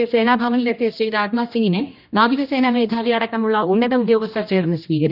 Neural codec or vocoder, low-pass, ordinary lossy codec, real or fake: codec, 16 kHz, 1 kbps, X-Codec, HuBERT features, trained on general audio; 5.4 kHz; none; fake